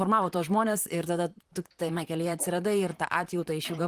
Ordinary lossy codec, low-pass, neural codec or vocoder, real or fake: Opus, 24 kbps; 14.4 kHz; vocoder, 44.1 kHz, 128 mel bands, Pupu-Vocoder; fake